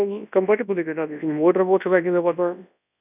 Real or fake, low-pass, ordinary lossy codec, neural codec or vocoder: fake; 3.6 kHz; none; codec, 24 kHz, 0.9 kbps, WavTokenizer, large speech release